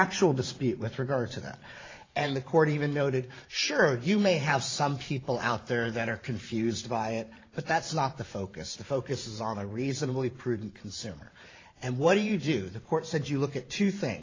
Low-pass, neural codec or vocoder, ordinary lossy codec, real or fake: 7.2 kHz; vocoder, 44.1 kHz, 128 mel bands every 512 samples, BigVGAN v2; AAC, 32 kbps; fake